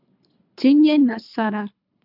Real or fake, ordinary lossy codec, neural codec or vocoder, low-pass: fake; AAC, 48 kbps; codec, 24 kHz, 3 kbps, HILCodec; 5.4 kHz